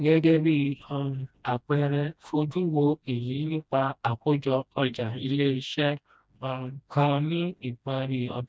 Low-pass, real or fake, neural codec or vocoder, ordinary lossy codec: none; fake; codec, 16 kHz, 1 kbps, FreqCodec, smaller model; none